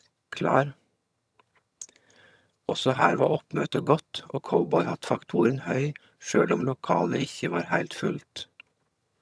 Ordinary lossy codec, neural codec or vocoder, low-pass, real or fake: none; vocoder, 22.05 kHz, 80 mel bands, HiFi-GAN; none; fake